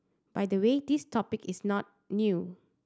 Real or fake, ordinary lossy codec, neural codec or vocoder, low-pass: real; none; none; none